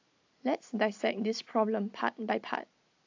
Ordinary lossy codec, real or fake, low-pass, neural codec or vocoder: AAC, 48 kbps; real; 7.2 kHz; none